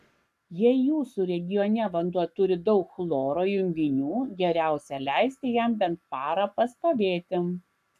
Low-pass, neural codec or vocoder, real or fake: 14.4 kHz; codec, 44.1 kHz, 7.8 kbps, Pupu-Codec; fake